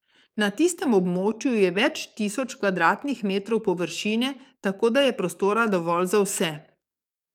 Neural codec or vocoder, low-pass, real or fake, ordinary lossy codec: codec, 44.1 kHz, 7.8 kbps, DAC; 19.8 kHz; fake; none